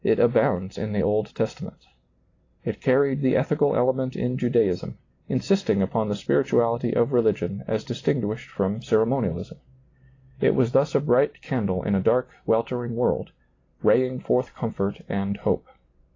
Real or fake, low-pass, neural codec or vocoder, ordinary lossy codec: real; 7.2 kHz; none; AAC, 32 kbps